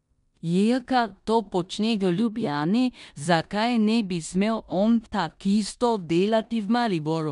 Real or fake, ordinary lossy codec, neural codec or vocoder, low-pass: fake; MP3, 96 kbps; codec, 16 kHz in and 24 kHz out, 0.9 kbps, LongCat-Audio-Codec, four codebook decoder; 10.8 kHz